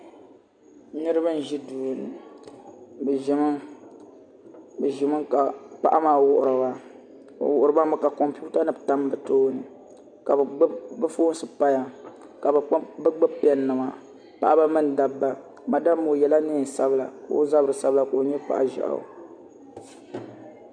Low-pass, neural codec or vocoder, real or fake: 9.9 kHz; none; real